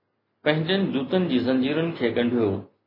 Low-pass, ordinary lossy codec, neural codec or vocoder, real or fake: 5.4 kHz; MP3, 24 kbps; none; real